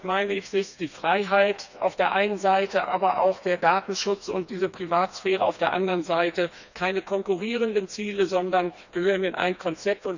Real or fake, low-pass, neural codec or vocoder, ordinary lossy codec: fake; 7.2 kHz; codec, 16 kHz, 2 kbps, FreqCodec, smaller model; none